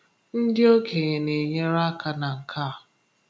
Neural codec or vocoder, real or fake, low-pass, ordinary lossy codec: none; real; none; none